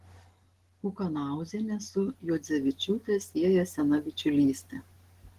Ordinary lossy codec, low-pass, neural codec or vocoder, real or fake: Opus, 16 kbps; 14.4 kHz; none; real